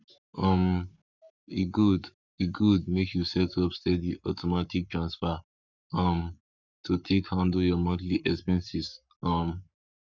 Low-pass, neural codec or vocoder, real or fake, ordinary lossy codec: 7.2 kHz; codec, 44.1 kHz, 7.8 kbps, Pupu-Codec; fake; none